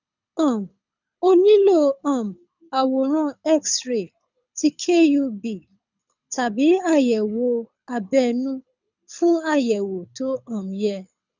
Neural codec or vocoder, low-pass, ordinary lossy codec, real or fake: codec, 24 kHz, 6 kbps, HILCodec; 7.2 kHz; none; fake